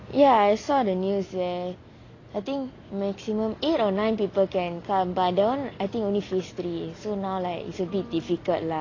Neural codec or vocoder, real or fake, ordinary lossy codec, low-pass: none; real; AAC, 32 kbps; 7.2 kHz